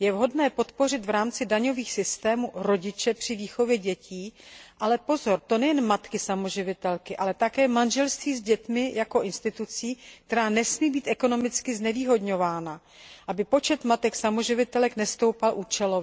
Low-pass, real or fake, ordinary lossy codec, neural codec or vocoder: none; real; none; none